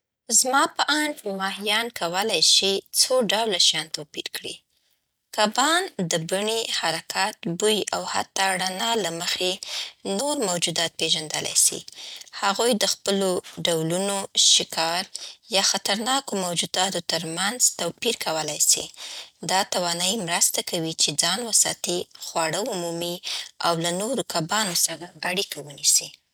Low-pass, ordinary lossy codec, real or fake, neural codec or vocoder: none; none; real; none